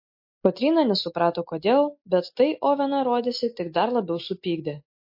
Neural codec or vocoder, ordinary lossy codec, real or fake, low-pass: none; MP3, 32 kbps; real; 5.4 kHz